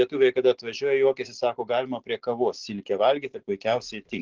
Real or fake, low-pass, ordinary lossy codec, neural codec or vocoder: real; 7.2 kHz; Opus, 16 kbps; none